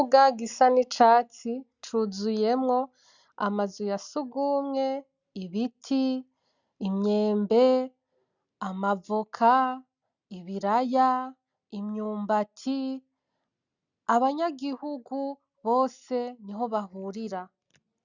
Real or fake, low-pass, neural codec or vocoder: real; 7.2 kHz; none